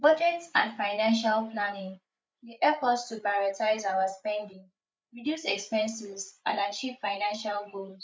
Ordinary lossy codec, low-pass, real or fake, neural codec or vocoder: none; none; fake; codec, 16 kHz, 8 kbps, FreqCodec, larger model